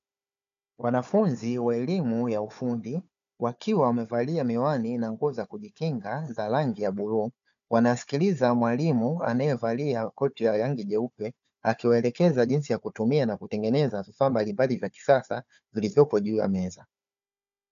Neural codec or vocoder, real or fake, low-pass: codec, 16 kHz, 4 kbps, FunCodec, trained on Chinese and English, 50 frames a second; fake; 7.2 kHz